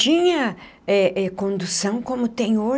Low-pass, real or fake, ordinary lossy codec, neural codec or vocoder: none; real; none; none